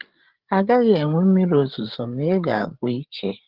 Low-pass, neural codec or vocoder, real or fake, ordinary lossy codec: 5.4 kHz; codec, 16 kHz, 8 kbps, FreqCodec, larger model; fake; Opus, 16 kbps